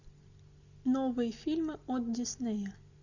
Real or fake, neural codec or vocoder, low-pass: real; none; 7.2 kHz